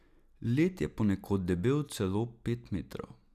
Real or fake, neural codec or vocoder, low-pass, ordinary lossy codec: real; none; 14.4 kHz; none